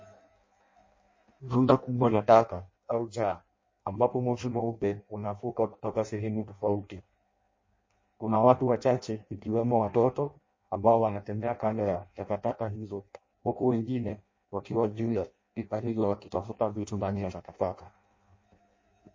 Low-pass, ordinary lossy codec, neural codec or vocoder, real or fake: 7.2 kHz; MP3, 32 kbps; codec, 16 kHz in and 24 kHz out, 0.6 kbps, FireRedTTS-2 codec; fake